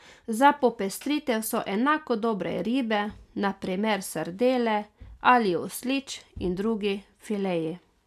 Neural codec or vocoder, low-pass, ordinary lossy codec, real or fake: none; 14.4 kHz; none; real